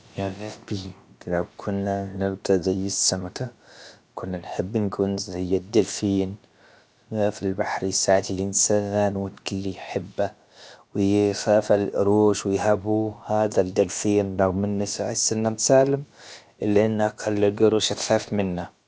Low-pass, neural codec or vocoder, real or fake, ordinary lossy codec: none; codec, 16 kHz, about 1 kbps, DyCAST, with the encoder's durations; fake; none